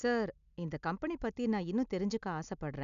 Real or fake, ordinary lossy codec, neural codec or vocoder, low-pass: real; none; none; 7.2 kHz